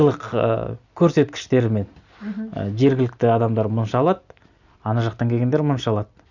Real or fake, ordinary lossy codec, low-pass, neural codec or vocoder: real; none; 7.2 kHz; none